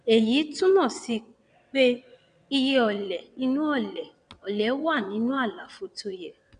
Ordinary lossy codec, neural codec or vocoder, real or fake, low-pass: none; vocoder, 22.05 kHz, 80 mel bands, WaveNeXt; fake; 9.9 kHz